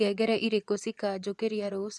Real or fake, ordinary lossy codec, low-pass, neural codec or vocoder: fake; none; none; vocoder, 24 kHz, 100 mel bands, Vocos